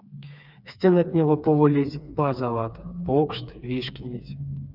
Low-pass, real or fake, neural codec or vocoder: 5.4 kHz; fake; codec, 16 kHz, 4 kbps, FreqCodec, smaller model